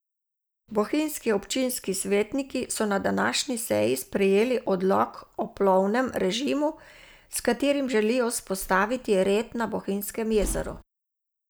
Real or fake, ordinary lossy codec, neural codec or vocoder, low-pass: real; none; none; none